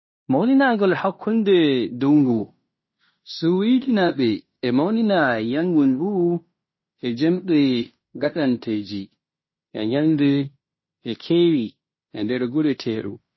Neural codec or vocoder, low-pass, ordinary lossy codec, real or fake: codec, 16 kHz in and 24 kHz out, 0.9 kbps, LongCat-Audio-Codec, fine tuned four codebook decoder; 7.2 kHz; MP3, 24 kbps; fake